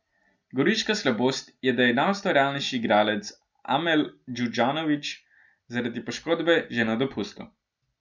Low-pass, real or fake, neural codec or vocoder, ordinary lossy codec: 7.2 kHz; real; none; none